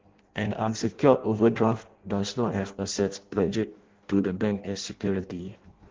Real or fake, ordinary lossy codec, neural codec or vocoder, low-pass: fake; Opus, 16 kbps; codec, 16 kHz in and 24 kHz out, 0.6 kbps, FireRedTTS-2 codec; 7.2 kHz